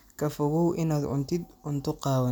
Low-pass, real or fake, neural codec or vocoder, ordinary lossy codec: none; real; none; none